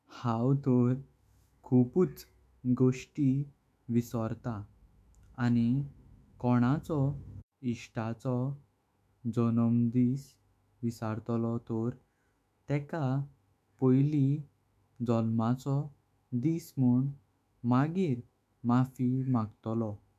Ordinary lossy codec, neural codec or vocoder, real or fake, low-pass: MP3, 96 kbps; autoencoder, 48 kHz, 128 numbers a frame, DAC-VAE, trained on Japanese speech; fake; 14.4 kHz